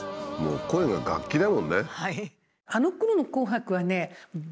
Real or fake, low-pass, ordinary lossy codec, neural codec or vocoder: real; none; none; none